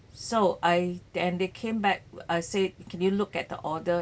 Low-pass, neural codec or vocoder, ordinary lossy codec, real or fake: none; none; none; real